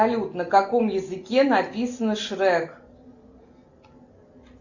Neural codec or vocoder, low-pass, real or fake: none; 7.2 kHz; real